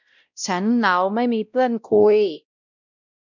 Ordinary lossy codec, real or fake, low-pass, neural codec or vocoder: none; fake; 7.2 kHz; codec, 16 kHz, 0.5 kbps, X-Codec, WavLM features, trained on Multilingual LibriSpeech